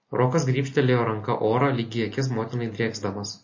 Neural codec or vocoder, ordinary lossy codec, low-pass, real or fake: none; MP3, 32 kbps; 7.2 kHz; real